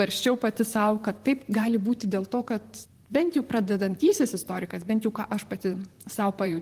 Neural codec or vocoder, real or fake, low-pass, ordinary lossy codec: vocoder, 44.1 kHz, 128 mel bands, Pupu-Vocoder; fake; 14.4 kHz; Opus, 24 kbps